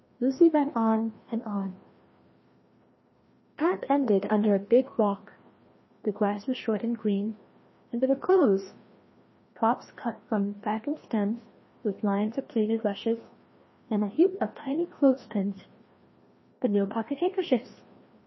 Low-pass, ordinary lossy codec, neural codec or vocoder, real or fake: 7.2 kHz; MP3, 24 kbps; codec, 16 kHz, 1 kbps, FreqCodec, larger model; fake